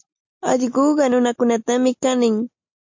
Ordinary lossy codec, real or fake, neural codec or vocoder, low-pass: MP3, 48 kbps; real; none; 7.2 kHz